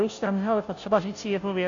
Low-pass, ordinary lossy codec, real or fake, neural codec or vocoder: 7.2 kHz; MP3, 64 kbps; fake; codec, 16 kHz, 0.5 kbps, FunCodec, trained on Chinese and English, 25 frames a second